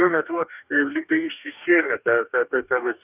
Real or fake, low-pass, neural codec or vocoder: fake; 3.6 kHz; codec, 44.1 kHz, 2.6 kbps, DAC